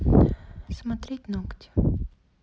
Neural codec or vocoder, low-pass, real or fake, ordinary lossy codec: none; none; real; none